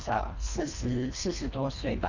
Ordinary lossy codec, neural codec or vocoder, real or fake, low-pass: none; codec, 24 kHz, 1.5 kbps, HILCodec; fake; 7.2 kHz